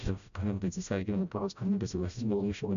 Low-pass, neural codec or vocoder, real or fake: 7.2 kHz; codec, 16 kHz, 0.5 kbps, FreqCodec, smaller model; fake